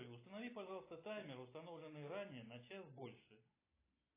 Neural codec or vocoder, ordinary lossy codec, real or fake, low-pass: none; AAC, 16 kbps; real; 3.6 kHz